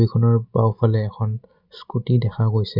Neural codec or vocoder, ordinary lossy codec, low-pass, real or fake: none; Opus, 64 kbps; 5.4 kHz; real